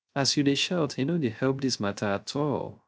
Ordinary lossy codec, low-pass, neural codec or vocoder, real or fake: none; none; codec, 16 kHz, 0.3 kbps, FocalCodec; fake